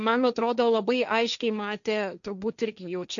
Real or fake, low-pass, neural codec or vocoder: fake; 7.2 kHz; codec, 16 kHz, 1.1 kbps, Voila-Tokenizer